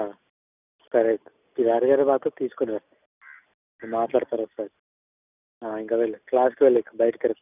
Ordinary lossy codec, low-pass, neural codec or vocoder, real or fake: none; 3.6 kHz; none; real